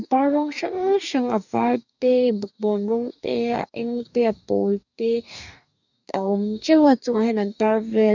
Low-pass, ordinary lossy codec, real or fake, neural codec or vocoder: 7.2 kHz; none; fake; codec, 44.1 kHz, 2.6 kbps, DAC